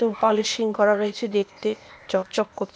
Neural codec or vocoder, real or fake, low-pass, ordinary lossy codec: codec, 16 kHz, 0.8 kbps, ZipCodec; fake; none; none